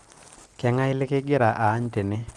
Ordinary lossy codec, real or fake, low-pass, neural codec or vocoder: Opus, 32 kbps; real; 10.8 kHz; none